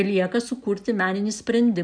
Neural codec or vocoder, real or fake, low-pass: none; real; 9.9 kHz